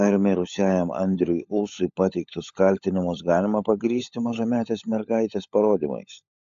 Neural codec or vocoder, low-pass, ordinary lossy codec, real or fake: codec, 16 kHz, 16 kbps, FunCodec, trained on LibriTTS, 50 frames a second; 7.2 kHz; AAC, 64 kbps; fake